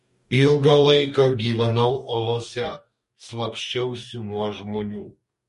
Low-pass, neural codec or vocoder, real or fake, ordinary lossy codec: 14.4 kHz; codec, 44.1 kHz, 2.6 kbps, DAC; fake; MP3, 48 kbps